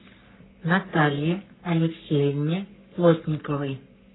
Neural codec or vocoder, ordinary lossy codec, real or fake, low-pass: codec, 44.1 kHz, 3.4 kbps, Pupu-Codec; AAC, 16 kbps; fake; 7.2 kHz